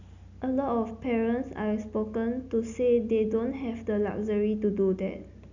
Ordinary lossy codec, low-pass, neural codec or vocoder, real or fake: none; 7.2 kHz; none; real